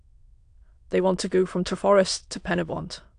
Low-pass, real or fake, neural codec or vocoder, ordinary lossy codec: 9.9 kHz; fake; autoencoder, 22.05 kHz, a latent of 192 numbers a frame, VITS, trained on many speakers; AAC, 64 kbps